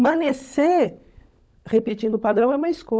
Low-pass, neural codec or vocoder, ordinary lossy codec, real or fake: none; codec, 16 kHz, 16 kbps, FunCodec, trained on LibriTTS, 50 frames a second; none; fake